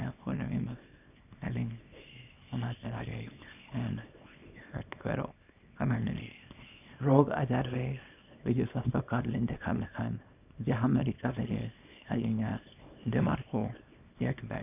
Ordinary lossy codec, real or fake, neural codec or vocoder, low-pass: none; fake; codec, 24 kHz, 0.9 kbps, WavTokenizer, small release; 3.6 kHz